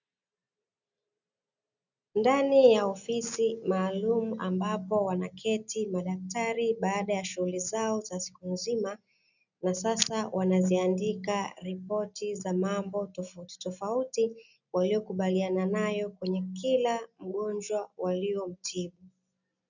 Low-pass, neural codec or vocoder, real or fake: 7.2 kHz; none; real